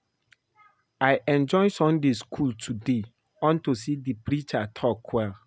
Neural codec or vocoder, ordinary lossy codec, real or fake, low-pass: none; none; real; none